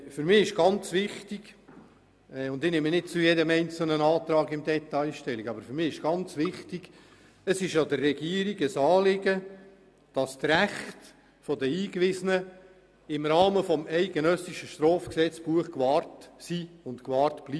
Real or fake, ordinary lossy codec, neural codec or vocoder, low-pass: real; none; none; none